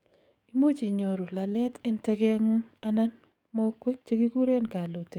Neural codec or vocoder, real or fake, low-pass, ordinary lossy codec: codec, 44.1 kHz, 7.8 kbps, DAC; fake; 19.8 kHz; none